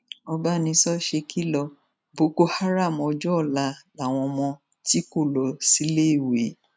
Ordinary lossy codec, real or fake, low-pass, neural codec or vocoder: none; real; none; none